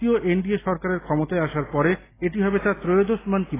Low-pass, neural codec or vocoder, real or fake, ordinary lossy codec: 3.6 kHz; none; real; AAC, 16 kbps